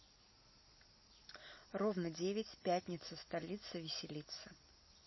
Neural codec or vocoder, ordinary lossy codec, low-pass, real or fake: none; MP3, 24 kbps; 7.2 kHz; real